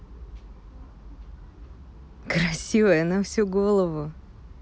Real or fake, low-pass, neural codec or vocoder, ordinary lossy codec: real; none; none; none